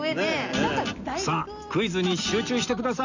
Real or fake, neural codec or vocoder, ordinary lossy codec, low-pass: real; none; none; 7.2 kHz